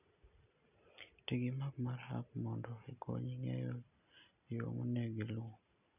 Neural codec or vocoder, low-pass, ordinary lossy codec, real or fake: none; 3.6 kHz; none; real